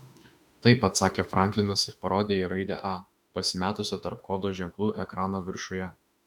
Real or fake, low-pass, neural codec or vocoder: fake; 19.8 kHz; autoencoder, 48 kHz, 32 numbers a frame, DAC-VAE, trained on Japanese speech